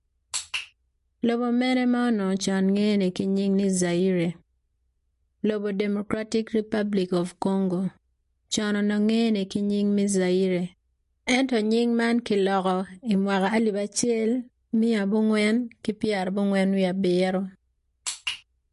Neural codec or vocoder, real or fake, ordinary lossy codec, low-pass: none; real; MP3, 48 kbps; 14.4 kHz